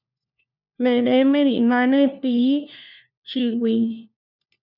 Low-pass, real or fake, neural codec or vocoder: 5.4 kHz; fake; codec, 16 kHz, 1 kbps, FunCodec, trained on LibriTTS, 50 frames a second